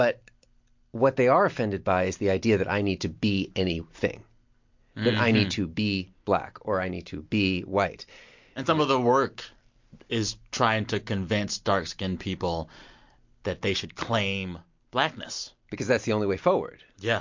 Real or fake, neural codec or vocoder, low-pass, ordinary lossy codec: real; none; 7.2 kHz; MP3, 48 kbps